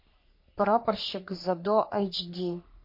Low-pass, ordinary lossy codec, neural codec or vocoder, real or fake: 5.4 kHz; MP3, 32 kbps; codec, 44.1 kHz, 3.4 kbps, Pupu-Codec; fake